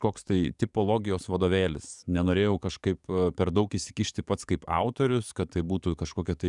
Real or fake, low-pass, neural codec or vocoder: fake; 10.8 kHz; codec, 44.1 kHz, 7.8 kbps, DAC